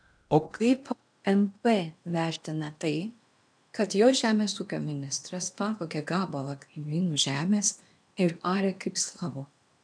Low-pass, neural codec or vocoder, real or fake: 9.9 kHz; codec, 16 kHz in and 24 kHz out, 0.8 kbps, FocalCodec, streaming, 65536 codes; fake